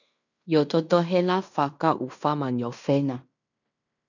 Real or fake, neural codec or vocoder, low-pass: fake; codec, 16 kHz in and 24 kHz out, 0.9 kbps, LongCat-Audio-Codec, fine tuned four codebook decoder; 7.2 kHz